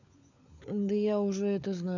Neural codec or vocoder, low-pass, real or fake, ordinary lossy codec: codec, 16 kHz, 16 kbps, FunCodec, trained on LibriTTS, 50 frames a second; 7.2 kHz; fake; none